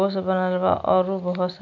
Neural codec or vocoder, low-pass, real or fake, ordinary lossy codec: none; 7.2 kHz; real; none